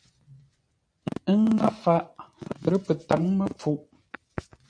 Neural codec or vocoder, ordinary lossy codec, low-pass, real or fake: vocoder, 24 kHz, 100 mel bands, Vocos; AAC, 64 kbps; 9.9 kHz; fake